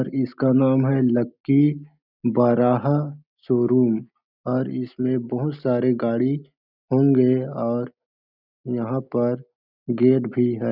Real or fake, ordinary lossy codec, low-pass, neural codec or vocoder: real; none; 5.4 kHz; none